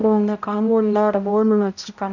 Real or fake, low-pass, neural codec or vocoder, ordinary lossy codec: fake; 7.2 kHz; codec, 16 kHz, 0.5 kbps, X-Codec, HuBERT features, trained on balanced general audio; none